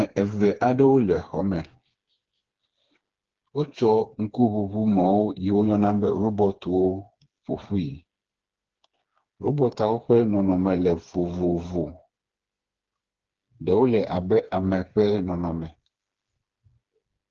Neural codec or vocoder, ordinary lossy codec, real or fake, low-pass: codec, 16 kHz, 4 kbps, FreqCodec, smaller model; Opus, 16 kbps; fake; 7.2 kHz